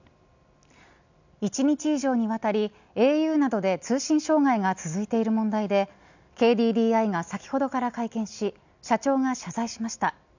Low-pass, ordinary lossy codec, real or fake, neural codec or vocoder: 7.2 kHz; none; real; none